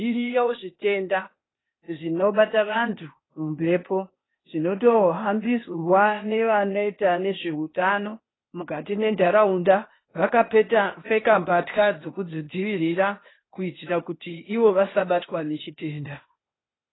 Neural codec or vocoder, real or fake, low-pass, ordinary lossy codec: codec, 16 kHz, 0.8 kbps, ZipCodec; fake; 7.2 kHz; AAC, 16 kbps